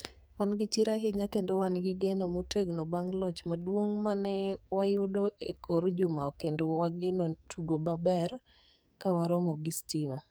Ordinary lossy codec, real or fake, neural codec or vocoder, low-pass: none; fake; codec, 44.1 kHz, 2.6 kbps, SNAC; none